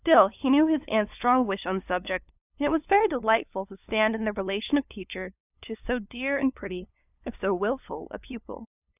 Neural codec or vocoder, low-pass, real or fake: codec, 16 kHz, 2 kbps, FunCodec, trained on LibriTTS, 25 frames a second; 3.6 kHz; fake